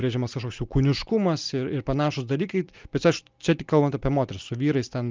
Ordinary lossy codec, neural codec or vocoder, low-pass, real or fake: Opus, 24 kbps; none; 7.2 kHz; real